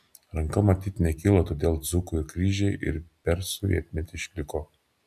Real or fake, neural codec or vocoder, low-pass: real; none; 14.4 kHz